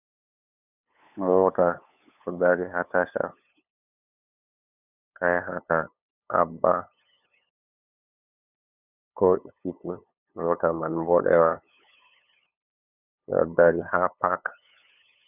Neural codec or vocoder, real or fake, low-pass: codec, 16 kHz, 8 kbps, FunCodec, trained on LibriTTS, 25 frames a second; fake; 3.6 kHz